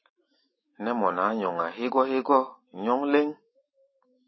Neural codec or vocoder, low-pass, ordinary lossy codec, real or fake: autoencoder, 48 kHz, 128 numbers a frame, DAC-VAE, trained on Japanese speech; 7.2 kHz; MP3, 24 kbps; fake